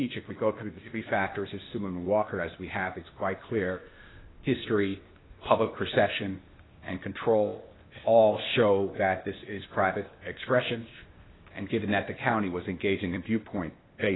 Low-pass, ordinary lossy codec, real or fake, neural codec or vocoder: 7.2 kHz; AAC, 16 kbps; fake; codec, 16 kHz, 0.8 kbps, ZipCodec